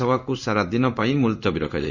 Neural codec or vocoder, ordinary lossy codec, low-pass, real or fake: codec, 16 kHz in and 24 kHz out, 1 kbps, XY-Tokenizer; none; 7.2 kHz; fake